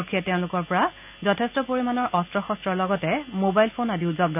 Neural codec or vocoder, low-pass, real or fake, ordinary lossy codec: none; 3.6 kHz; real; none